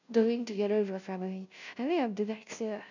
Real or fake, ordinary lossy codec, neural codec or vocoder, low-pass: fake; none; codec, 16 kHz, 0.5 kbps, FunCodec, trained on Chinese and English, 25 frames a second; 7.2 kHz